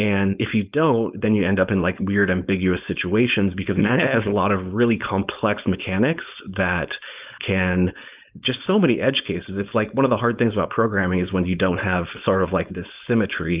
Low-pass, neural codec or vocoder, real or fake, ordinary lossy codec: 3.6 kHz; codec, 16 kHz, 4.8 kbps, FACodec; fake; Opus, 24 kbps